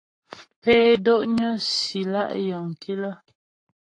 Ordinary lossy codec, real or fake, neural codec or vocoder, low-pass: AAC, 48 kbps; fake; codec, 44.1 kHz, 7.8 kbps, Pupu-Codec; 9.9 kHz